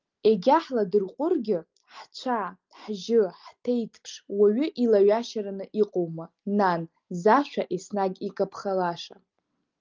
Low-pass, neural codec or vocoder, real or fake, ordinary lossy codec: 7.2 kHz; none; real; Opus, 24 kbps